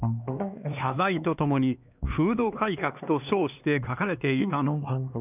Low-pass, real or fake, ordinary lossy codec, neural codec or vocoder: 3.6 kHz; fake; none; codec, 16 kHz, 2 kbps, X-Codec, HuBERT features, trained on LibriSpeech